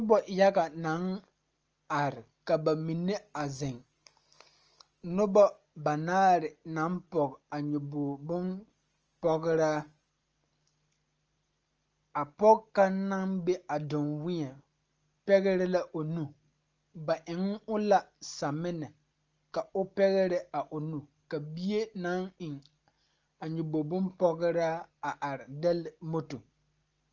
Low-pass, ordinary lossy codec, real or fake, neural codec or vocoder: 7.2 kHz; Opus, 24 kbps; real; none